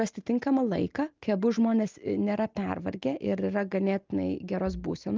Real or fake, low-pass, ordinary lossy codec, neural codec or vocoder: real; 7.2 kHz; Opus, 24 kbps; none